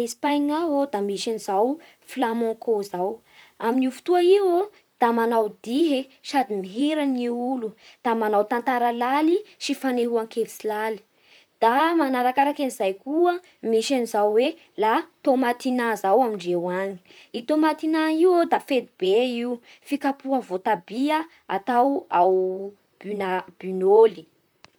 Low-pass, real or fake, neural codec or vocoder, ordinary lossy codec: none; fake; vocoder, 44.1 kHz, 128 mel bands, Pupu-Vocoder; none